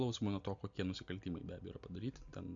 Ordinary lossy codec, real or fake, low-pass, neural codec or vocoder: MP3, 64 kbps; real; 7.2 kHz; none